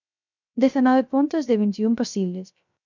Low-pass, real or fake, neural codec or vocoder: 7.2 kHz; fake; codec, 16 kHz, 0.3 kbps, FocalCodec